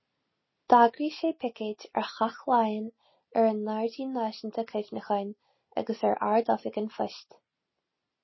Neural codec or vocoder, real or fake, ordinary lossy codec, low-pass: none; real; MP3, 24 kbps; 7.2 kHz